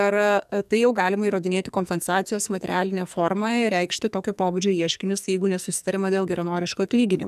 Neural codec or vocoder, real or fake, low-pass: codec, 44.1 kHz, 2.6 kbps, SNAC; fake; 14.4 kHz